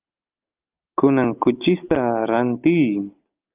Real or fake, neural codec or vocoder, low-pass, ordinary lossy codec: real; none; 3.6 kHz; Opus, 32 kbps